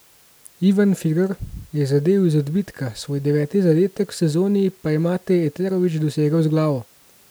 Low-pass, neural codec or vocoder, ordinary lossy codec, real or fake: none; none; none; real